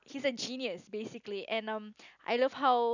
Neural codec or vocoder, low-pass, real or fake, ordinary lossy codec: none; 7.2 kHz; real; none